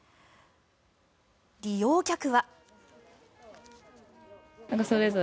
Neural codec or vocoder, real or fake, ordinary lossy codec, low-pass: none; real; none; none